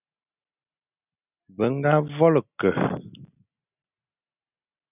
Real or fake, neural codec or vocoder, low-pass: real; none; 3.6 kHz